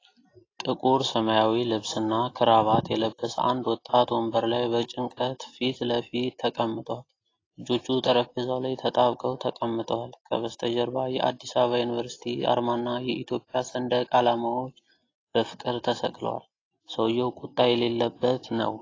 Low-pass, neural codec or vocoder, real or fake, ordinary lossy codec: 7.2 kHz; none; real; AAC, 32 kbps